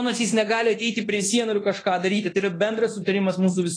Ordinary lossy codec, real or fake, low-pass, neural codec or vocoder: AAC, 32 kbps; fake; 9.9 kHz; codec, 24 kHz, 0.9 kbps, DualCodec